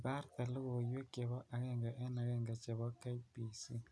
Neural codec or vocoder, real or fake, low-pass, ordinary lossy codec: none; real; none; none